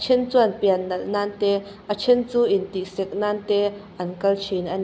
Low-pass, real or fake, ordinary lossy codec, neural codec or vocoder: none; real; none; none